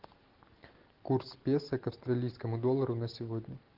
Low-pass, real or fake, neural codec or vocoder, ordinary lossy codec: 5.4 kHz; real; none; Opus, 16 kbps